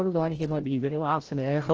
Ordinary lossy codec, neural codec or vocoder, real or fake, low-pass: Opus, 16 kbps; codec, 16 kHz, 0.5 kbps, FreqCodec, larger model; fake; 7.2 kHz